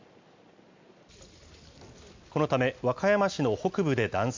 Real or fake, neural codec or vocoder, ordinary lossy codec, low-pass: real; none; MP3, 64 kbps; 7.2 kHz